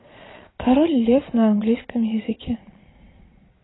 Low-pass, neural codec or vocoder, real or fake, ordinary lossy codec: 7.2 kHz; vocoder, 22.05 kHz, 80 mel bands, Vocos; fake; AAC, 16 kbps